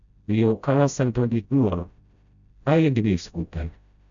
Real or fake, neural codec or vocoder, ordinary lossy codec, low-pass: fake; codec, 16 kHz, 0.5 kbps, FreqCodec, smaller model; none; 7.2 kHz